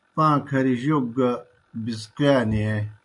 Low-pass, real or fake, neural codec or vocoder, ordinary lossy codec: 10.8 kHz; real; none; MP3, 64 kbps